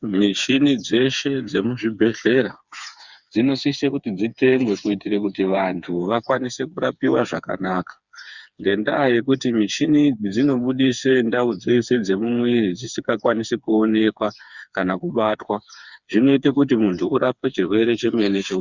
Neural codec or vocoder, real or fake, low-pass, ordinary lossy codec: codec, 16 kHz, 4 kbps, FreqCodec, smaller model; fake; 7.2 kHz; Opus, 64 kbps